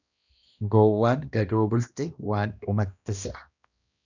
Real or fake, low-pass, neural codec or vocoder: fake; 7.2 kHz; codec, 16 kHz, 1 kbps, X-Codec, HuBERT features, trained on balanced general audio